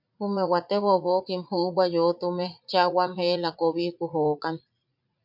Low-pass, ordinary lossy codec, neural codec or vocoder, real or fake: 5.4 kHz; MP3, 48 kbps; vocoder, 44.1 kHz, 80 mel bands, Vocos; fake